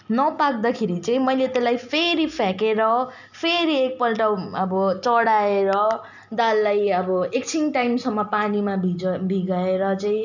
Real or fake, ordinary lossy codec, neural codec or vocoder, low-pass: real; none; none; 7.2 kHz